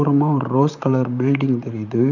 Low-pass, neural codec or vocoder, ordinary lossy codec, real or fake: 7.2 kHz; vocoder, 44.1 kHz, 128 mel bands, Pupu-Vocoder; none; fake